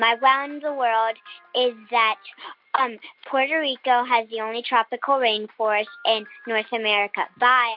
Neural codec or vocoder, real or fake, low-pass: none; real; 5.4 kHz